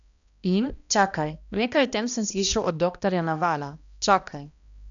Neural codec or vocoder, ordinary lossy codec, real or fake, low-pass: codec, 16 kHz, 1 kbps, X-Codec, HuBERT features, trained on balanced general audio; none; fake; 7.2 kHz